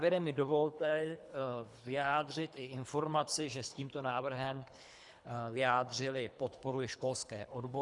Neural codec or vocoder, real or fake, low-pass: codec, 24 kHz, 3 kbps, HILCodec; fake; 10.8 kHz